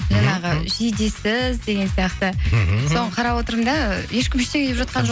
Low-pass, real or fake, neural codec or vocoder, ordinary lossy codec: none; real; none; none